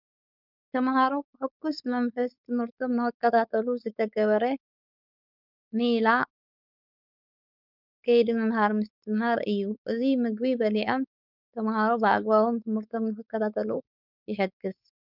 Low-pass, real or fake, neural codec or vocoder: 5.4 kHz; fake; codec, 16 kHz, 4.8 kbps, FACodec